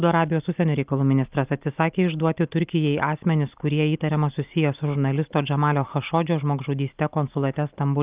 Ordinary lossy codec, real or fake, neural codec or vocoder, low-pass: Opus, 32 kbps; real; none; 3.6 kHz